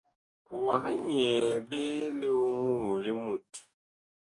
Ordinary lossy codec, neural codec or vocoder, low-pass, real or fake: MP3, 96 kbps; codec, 44.1 kHz, 2.6 kbps, DAC; 10.8 kHz; fake